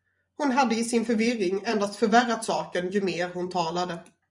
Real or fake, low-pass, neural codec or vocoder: fake; 10.8 kHz; vocoder, 24 kHz, 100 mel bands, Vocos